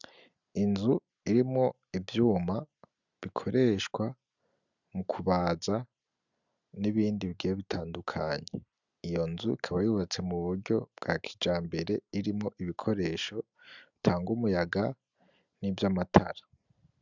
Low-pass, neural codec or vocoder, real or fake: 7.2 kHz; none; real